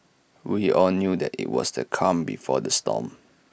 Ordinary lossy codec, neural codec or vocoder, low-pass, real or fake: none; none; none; real